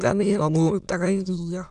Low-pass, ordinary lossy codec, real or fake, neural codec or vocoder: 9.9 kHz; none; fake; autoencoder, 22.05 kHz, a latent of 192 numbers a frame, VITS, trained on many speakers